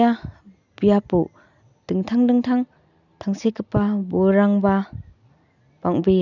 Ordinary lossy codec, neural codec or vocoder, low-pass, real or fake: none; none; 7.2 kHz; real